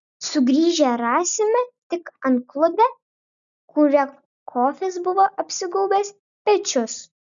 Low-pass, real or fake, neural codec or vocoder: 7.2 kHz; real; none